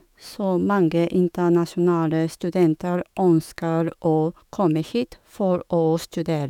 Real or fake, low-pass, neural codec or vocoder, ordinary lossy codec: fake; 19.8 kHz; autoencoder, 48 kHz, 128 numbers a frame, DAC-VAE, trained on Japanese speech; none